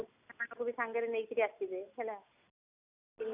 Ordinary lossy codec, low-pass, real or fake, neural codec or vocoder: none; 3.6 kHz; real; none